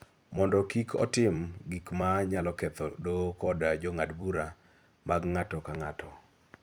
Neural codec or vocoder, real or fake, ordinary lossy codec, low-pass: vocoder, 44.1 kHz, 128 mel bands every 256 samples, BigVGAN v2; fake; none; none